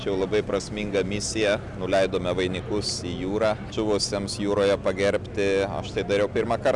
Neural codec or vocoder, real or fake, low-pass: none; real; 10.8 kHz